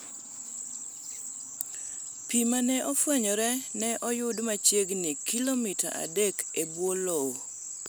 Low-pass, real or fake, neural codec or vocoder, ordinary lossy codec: none; real; none; none